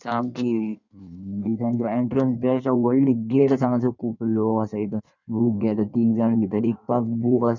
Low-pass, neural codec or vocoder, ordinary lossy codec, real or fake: 7.2 kHz; codec, 16 kHz in and 24 kHz out, 1.1 kbps, FireRedTTS-2 codec; none; fake